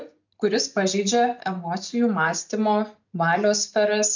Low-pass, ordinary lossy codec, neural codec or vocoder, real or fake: 7.2 kHz; MP3, 64 kbps; none; real